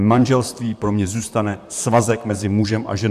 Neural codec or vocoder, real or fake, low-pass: vocoder, 44.1 kHz, 128 mel bands, Pupu-Vocoder; fake; 14.4 kHz